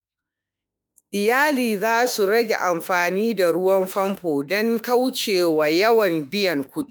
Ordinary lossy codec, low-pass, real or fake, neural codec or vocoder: none; none; fake; autoencoder, 48 kHz, 32 numbers a frame, DAC-VAE, trained on Japanese speech